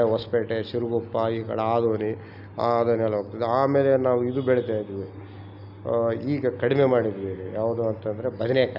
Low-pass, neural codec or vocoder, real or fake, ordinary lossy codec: 5.4 kHz; none; real; none